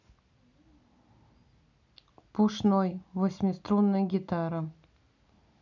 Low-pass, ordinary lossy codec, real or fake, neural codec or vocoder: 7.2 kHz; none; real; none